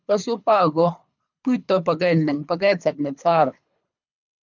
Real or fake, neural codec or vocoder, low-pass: fake; codec, 24 kHz, 3 kbps, HILCodec; 7.2 kHz